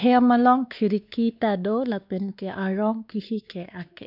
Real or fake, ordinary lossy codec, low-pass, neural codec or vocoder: fake; none; 5.4 kHz; codec, 16 kHz, 2 kbps, X-Codec, WavLM features, trained on Multilingual LibriSpeech